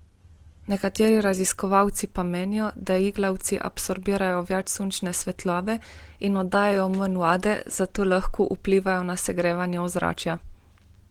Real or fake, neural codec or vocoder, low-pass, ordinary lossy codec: real; none; 19.8 kHz; Opus, 16 kbps